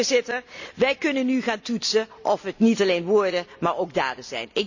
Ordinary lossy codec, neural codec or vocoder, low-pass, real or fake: none; none; 7.2 kHz; real